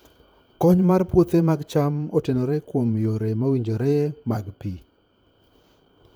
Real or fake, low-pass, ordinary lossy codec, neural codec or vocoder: fake; none; none; vocoder, 44.1 kHz, 128 mel bands, Pupu-Vocoder